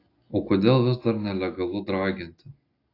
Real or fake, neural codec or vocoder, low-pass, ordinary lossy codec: real; none; 5.4 kHz; AAC, 32 kbps